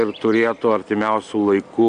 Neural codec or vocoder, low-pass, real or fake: none; 9.9 kHz; real